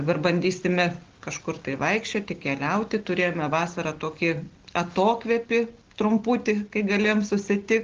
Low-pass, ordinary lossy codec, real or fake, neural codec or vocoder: 7.2 kHz; Opus, 16 kbps; real; none